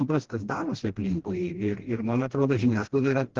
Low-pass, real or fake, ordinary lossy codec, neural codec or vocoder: 7.2 kHz; fake; Opus, 16 kbps; codec, 16 kHz, 1 kbps, FreqCodec, smaller model